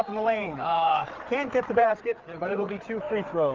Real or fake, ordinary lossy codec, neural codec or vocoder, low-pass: fake; Opus, 24 kbps; codec, 16 kHz, 4 kbps, FreqCodec, larger model; 7.2 kHz